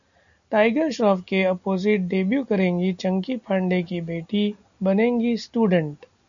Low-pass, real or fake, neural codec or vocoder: 7.2 kHz; real; none